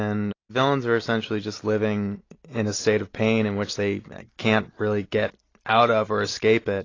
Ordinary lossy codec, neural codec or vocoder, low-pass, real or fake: AAC, 32 kbps; none; 7.2 kHz; real